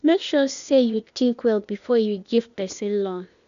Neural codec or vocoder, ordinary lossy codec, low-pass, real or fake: codec, 16 kHz, 0.8 kbps, ZipCodec; MP3, 96 kbps; 7.2 kHz; fake